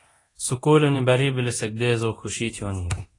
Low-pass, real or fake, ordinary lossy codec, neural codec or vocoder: 10.8 kHz; fake; AAC, 32 kbps; codec, 24 kHz, 0.9 kbps, DualCodec